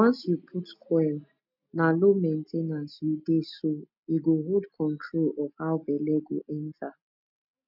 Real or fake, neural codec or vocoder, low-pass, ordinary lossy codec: real; none; 5.4 kHz; none